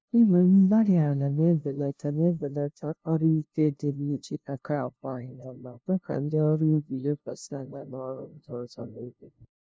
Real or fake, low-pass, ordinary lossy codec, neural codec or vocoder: fake; none; none; codec, 16 kHz, 0.5 kbps, FunCodec, trained on LibriTTS, 25 frames a second